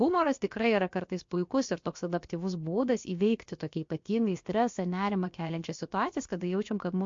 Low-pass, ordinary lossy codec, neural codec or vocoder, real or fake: 7.2 kHz; MP3, 48 kbps; codec, 16 kHz, about 1 kbps, DyCAST, with the encoder's durations; fake